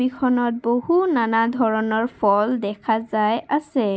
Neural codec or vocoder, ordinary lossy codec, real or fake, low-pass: none; none; real; none